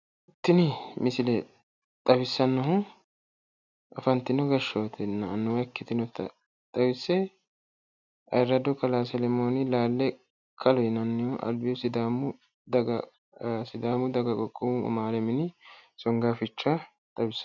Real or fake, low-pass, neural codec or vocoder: real; 7.2 kHz; none